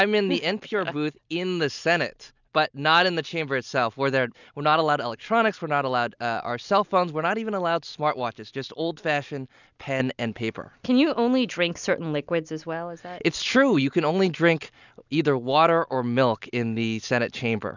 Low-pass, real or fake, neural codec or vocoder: 7.2 kHz; real; none